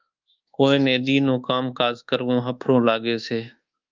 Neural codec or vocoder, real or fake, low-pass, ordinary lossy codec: codec, 24 kHz, 1.2 kbps, DualCodec; fake; 7.2 kHz; Opus, 24 kbps